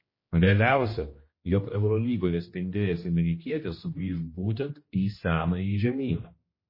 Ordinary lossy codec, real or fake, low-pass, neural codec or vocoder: MP3, 24 kbps; fake; 5.4 kHz; codec, 16 kHz, 1 kbps, X-Codec, HuBERT features, trained on general audio